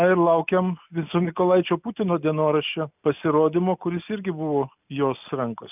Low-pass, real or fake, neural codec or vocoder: 3.6 kHz; real; none